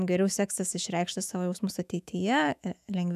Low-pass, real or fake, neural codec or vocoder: 14.4 kHz; fake; autoencoder, 48 kHz, 128 numbers a frame, DAC-VAE, trained on Japanese speech